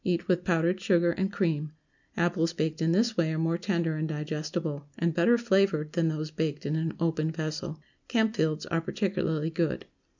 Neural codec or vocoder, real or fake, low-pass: none; real; 7.2 kHz